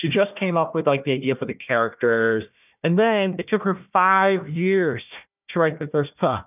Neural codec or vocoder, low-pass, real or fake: codec, 16 kHz, 1 kbps, FunCodec, trained on Chinese and English, 50 frames a second; 3.6 kHz; fake